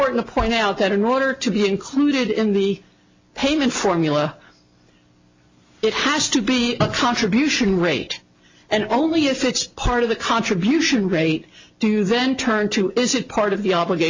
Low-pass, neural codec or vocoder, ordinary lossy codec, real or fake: 7.2 kHz; none; MP3, 64 kbps; real